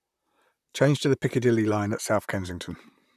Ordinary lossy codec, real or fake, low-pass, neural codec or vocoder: none; fake; 14.4 kHz; vocoder, 44.1 kHz, 128 mel bands, Pupu-Vocoder